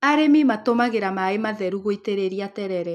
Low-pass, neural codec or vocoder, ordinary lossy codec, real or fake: 14.4 kHz; none; none; real